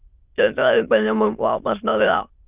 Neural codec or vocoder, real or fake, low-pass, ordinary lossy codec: autoencoder, 22.05 kHz, a latent of 192 numbers a frame, VITS, trained on many speakers; fake; 3.6 kHz; Opus, 24 kbps